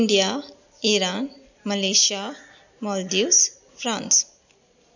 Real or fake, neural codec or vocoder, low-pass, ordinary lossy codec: real; none; 7.2 kHz; none